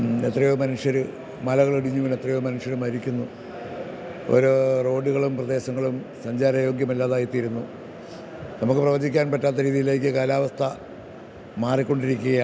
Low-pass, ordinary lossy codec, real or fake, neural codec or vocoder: none; none; real; none